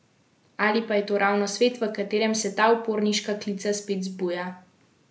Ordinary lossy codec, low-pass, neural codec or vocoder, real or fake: none; none; none; real